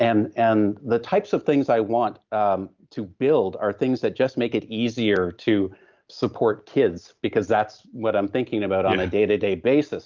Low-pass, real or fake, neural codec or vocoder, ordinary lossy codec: 7.2 kHz; real; none; Opus, 24 kbps